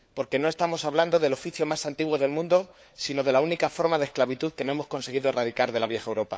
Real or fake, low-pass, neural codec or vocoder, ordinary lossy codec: fake; none; codec, 16 kHz, 4 kbps, FunCodec, trained on LibriTTS, 50 frames a second; none